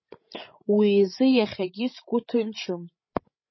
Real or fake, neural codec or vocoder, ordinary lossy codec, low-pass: fake; codec, 16 kHz, 16 kbps, FreqCodec, larger model; MP3, 24 kbps; 7.2 kHz